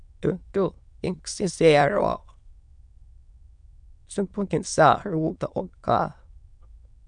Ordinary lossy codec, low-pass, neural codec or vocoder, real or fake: MP3, 96 kbps; 9.9 kHz; autoencoder, 22.05 kHz, a latent of 192 numbers a frame, VITS, trained on many speakers; fake